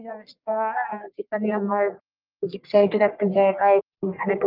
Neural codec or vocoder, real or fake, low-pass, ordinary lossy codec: codec, 44.1 kHz, 1.7 kbps, Pupu-Codec; fake; 5.4 kHz; Opus, 32 kbps